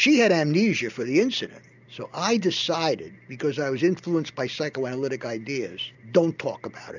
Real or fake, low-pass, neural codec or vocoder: real; 7.2 kHz; none